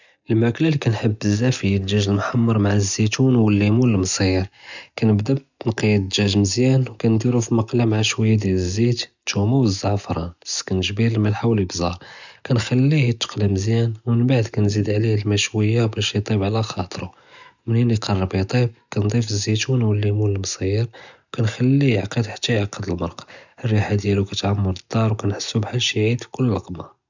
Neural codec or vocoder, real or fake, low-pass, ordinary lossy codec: none; real; 7.2 kHz; none